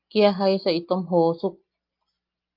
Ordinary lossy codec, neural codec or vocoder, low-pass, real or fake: Opus, 24 kbps; none; 5.4 kHz; real